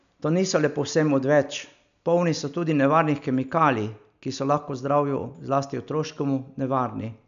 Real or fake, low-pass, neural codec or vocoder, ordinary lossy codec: real; 7.2 kHz; none; MP3, 96 kbps